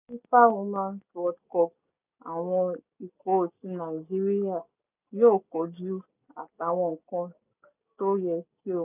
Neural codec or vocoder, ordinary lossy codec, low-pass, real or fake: none; none; 3.6 kHz; real